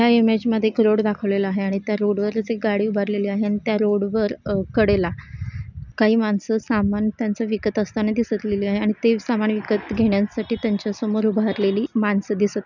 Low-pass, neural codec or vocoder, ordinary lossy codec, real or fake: 7.2 kHz; none; none; real